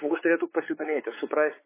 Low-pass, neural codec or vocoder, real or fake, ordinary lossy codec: 3.6 kHz; codec, 16 kHz, 4 kbps, X-Codec, WavLM features, trained on Multilingual LibriSpeech; fake; MP3, 16 kbps